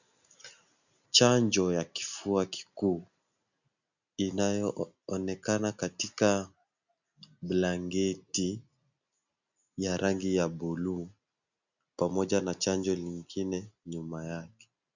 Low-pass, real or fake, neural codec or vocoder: 7.2 kHz; real; none